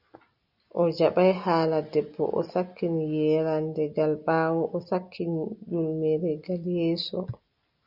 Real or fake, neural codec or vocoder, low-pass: real; none; 5.4 kHz